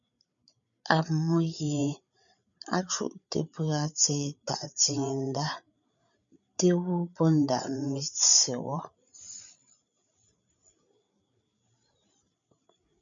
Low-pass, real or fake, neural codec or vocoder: 7.2 kHz; fake; codec, 16 kHz, 8 kbps, FreqCodec, larger model